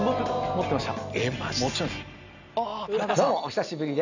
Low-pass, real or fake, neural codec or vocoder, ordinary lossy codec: 7.2 kHz; real; none; none